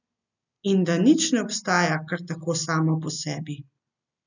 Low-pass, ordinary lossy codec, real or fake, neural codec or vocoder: 7.2 kHz; none; real; none